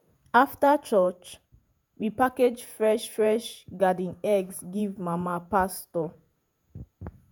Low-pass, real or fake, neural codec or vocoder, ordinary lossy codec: none; fake; vocoder, 48 kHz, 128 mel bands, Vocos; none